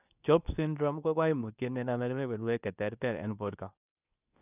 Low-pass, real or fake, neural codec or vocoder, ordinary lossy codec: 3.6 kHz; fake; codec, 24 kHz, 0.9 kbps, WavTokenizer, medium speech release version 2; none